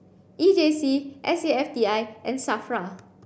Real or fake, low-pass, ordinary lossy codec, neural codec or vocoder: real; none; none; none